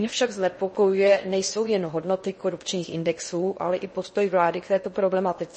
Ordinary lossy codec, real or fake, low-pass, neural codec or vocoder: MP3, 32 kbps; fake; 10.8 kHz; codec, 16 kHz in and 24 kHz out, 0.6 kbps, FocalCodec, streaming, 4096 codes